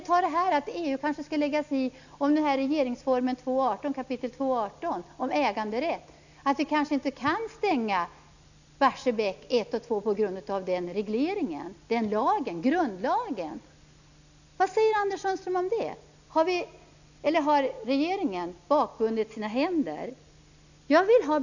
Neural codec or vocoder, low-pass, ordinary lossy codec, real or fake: none; 7.2 kHz; none; real